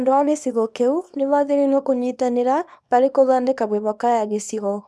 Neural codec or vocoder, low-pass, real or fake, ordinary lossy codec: codec, 24 kHz, 0.9 kbps, WavTokenizer, small release; none; fake; none